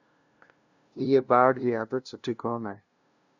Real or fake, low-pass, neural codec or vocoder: fake; 7.2 kHz; codec, 16 kHz, 0.5 kbps, FunCodec, trained on LibriTTS, 25 frames a second